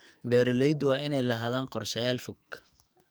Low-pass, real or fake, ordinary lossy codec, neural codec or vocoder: none; fake; none; codec, 44.1 kHz, 2.6 kbps, SNAC